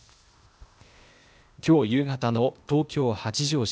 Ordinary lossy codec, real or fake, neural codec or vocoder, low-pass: none; fake; codec, 16 kHz, 0.8 kbps, ZipCodec; none